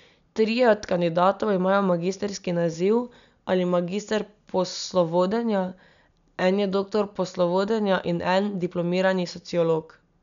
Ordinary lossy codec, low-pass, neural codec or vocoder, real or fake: none; 7.2 kHz; none; real